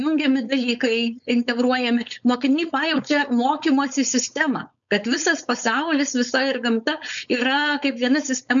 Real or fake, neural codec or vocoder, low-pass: fake; codec, 16 kHz, 4.8 kbps, FACodec; 7.2 kHz